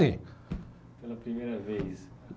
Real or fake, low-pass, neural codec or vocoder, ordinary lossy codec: real; none; none; none